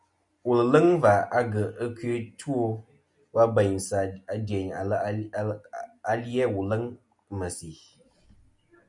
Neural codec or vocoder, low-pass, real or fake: none; 10.8 kHz; real